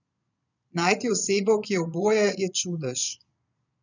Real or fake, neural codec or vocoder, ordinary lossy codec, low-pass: fake; vocoder, 22.05 kHz, 80 mel bands, Vocos; none; 7.2 kHz